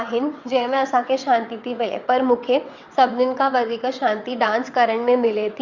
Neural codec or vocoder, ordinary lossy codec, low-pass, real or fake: vocoder, 22.05 kHz, 80 mel bands, WaveNeXt; Opus, 64 kbps; 7.2 kHz; fake